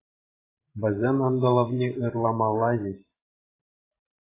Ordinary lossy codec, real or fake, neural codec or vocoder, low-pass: AAC, 16 kbps; fake; vocoder, 44.1 kHz, 128 mel bands every 512 samples, BigVGAN v2; 3.6 kHz